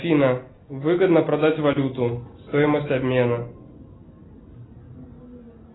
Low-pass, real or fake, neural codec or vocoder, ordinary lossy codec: 7.2 kHz; real; none; AAC, 16 kbps